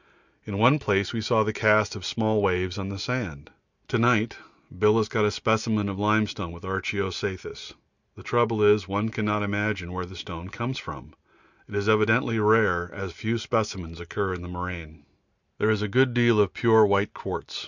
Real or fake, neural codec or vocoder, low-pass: real; none; 7.2 kHz